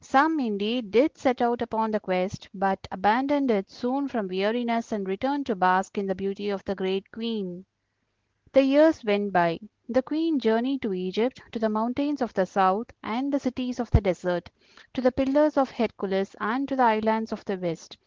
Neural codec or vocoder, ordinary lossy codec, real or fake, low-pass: none; Opus, 16 kbps; real; 7.2 kHz